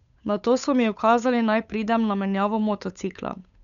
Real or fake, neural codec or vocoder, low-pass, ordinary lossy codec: fake; codec, 16 kHz, 8 kbps, FunCodec, trained on Chinese and English, 25 frames a second; 7.2 kHz; none